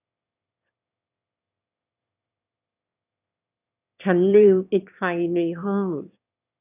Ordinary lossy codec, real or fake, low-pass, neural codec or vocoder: none; fake; 3.6 kHz; autoencoder, 22.05 kHz, a latent of 192 numbers a frame, VITS, trained on one speaker